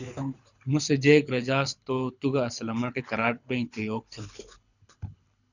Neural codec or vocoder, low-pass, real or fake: codec, 24 kHz, 6 kbps, HILCodec; 7.2 kHz; fake